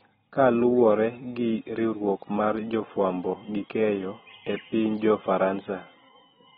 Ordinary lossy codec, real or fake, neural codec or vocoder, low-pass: AAC, 16 kbps; real; none; 19.8 kHz